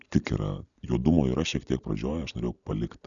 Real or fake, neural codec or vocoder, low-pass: real; none; 7.2 kHz